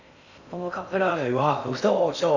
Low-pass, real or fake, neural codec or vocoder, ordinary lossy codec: 7.2 kHz; fake; codec, 16 kHz in and 24 kHz out, 0.6 kbps, FocalCodec, streaming, 4096 codes; none